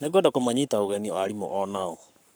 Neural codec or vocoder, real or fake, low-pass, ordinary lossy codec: codec, 44.1 kHz, 7.8 kbps, Pupu-Codec; fake; none; none